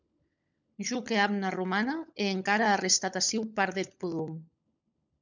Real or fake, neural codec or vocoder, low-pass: fake; codec, 16 kHz, 16 kbps, FunCodec, trained on LibriTTS, 50 frames a second; 7.2 kHz